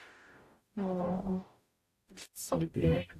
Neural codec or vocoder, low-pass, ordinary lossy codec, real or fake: codec, 44.1 kHz, 0.9 kbps, DAC; 14.4 kHz; AAC, 64 kbps; fake